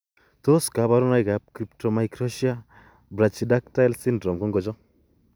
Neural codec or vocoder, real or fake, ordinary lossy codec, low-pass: none; real; none; none